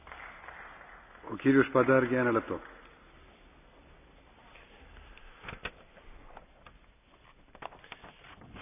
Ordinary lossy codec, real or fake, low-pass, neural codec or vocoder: none; real; 3.6 kHz; none